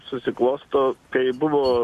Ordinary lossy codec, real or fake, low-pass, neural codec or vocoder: AAC, 64 kbps; real; 14.4 kHz; none